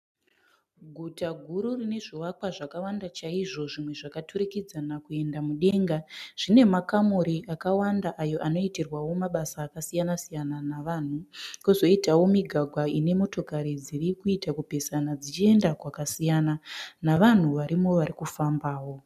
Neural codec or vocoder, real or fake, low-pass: none; real; 14.4 kHz